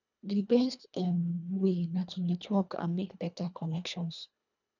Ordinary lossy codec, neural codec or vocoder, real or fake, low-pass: none; codec, 24 kHz, 1.5 kbps, HILCodec; fake; 7.2 kHz